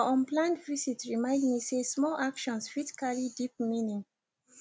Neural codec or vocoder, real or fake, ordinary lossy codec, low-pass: none; real; none; none